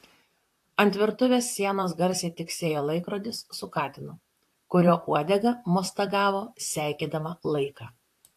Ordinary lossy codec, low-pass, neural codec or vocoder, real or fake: AAC, 64 kbps; 14.4 kHz; vocoder, 44.1 kHz, 128 mel bands, Pupu-Vocoder; fake